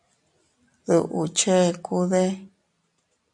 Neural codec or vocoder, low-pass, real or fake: none; 10.8 kHz; real